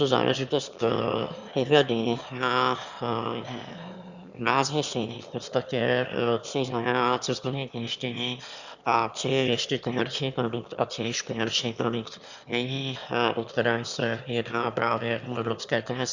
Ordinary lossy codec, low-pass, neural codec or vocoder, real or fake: Opus, 64 kbps; 7.2 kHz; autoencoder, 22.05 kHz, a latent of 192 numbers a frame, VITS, trained on one speaker; fake